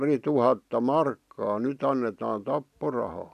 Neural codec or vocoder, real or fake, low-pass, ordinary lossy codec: none; real; 14.4 kHz; none